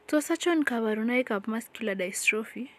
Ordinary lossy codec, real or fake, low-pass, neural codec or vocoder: none; real; 14.4 kHz; none